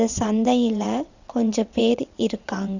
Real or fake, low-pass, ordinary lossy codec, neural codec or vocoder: fake; 7.2 kHz; none; vocoder, 22.05 kHz, 80 mel bands, WaveNeXt